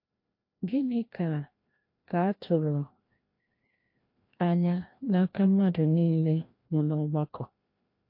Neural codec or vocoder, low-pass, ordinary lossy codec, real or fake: codec, 16 kHz, 1 kbps, FreqCodec, larger model; 5.4 kHz; MP3, 32 kbps; fake